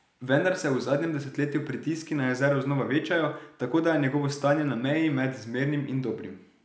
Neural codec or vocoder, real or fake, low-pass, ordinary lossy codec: none; real; none; none